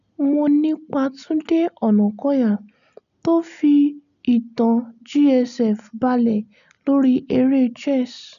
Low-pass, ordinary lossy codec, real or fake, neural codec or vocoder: 7.2 kHz; none; real; none